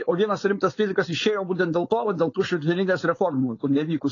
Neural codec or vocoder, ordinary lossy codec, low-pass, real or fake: codec, 16 kHz, 4.8 kbps, FACodec; AAC, 32 kbps; 7.2 kHz; fake